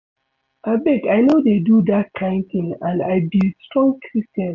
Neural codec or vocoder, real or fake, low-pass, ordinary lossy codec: none; real; 7.2 kHz; none